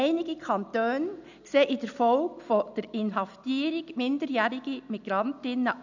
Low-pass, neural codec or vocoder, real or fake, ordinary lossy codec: 7.2 kHz; none; real; none